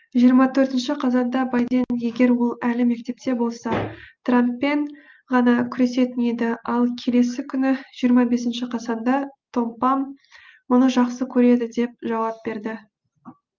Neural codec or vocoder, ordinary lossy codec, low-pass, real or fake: none; Opus, 32 kbps; 7.2 kHz; real